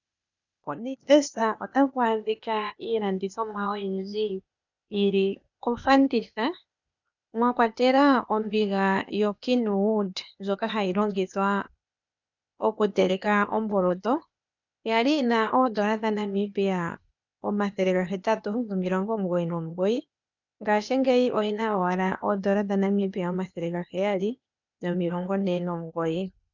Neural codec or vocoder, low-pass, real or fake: codec, 16 kHz, 0.8 kbps, ZipCodec; 7.2 kHz; fake